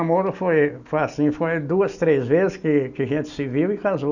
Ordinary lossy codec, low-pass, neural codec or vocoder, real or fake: none; 7.2 kHz; none; real